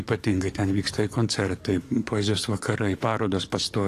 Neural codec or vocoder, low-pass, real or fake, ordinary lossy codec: codec, 44.1 kHz, 7.8 kbps, DAC; 14.4 kHz; fake; AAC, 48 kbps